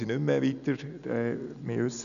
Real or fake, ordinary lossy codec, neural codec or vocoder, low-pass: real; none; none; 7.2 kHz